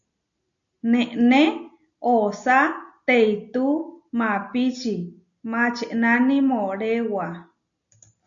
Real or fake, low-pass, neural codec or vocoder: real; 7.2 kHz; none